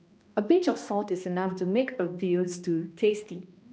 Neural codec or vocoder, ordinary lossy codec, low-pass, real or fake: codec, 16 kHz, 1 kbps, X-Codec, HuBERT features, trained on balanced general audio; none; none; fake